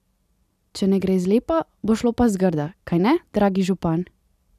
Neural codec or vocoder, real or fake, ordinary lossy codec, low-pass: none; real; none; 14.4 kHz